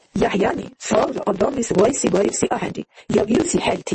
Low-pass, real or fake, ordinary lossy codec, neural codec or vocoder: 10.8 kHz; fake; MP3, 32 kbps; vocoder, 24 kHz, 100 mel bands, Vocos